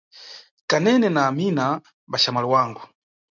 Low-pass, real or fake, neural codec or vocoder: 7.2 kHz; real; none